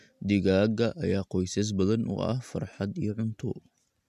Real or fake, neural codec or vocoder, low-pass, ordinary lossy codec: real; none; 14.4 kHz; MP3, 96 kbps